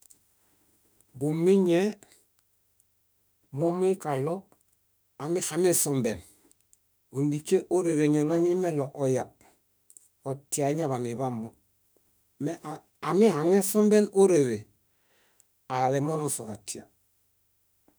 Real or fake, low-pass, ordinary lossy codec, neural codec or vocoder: fake; none; none; autoencoder, 48 kHz, 32 numbers a frame, DAC-VAE, trained on Japanese speech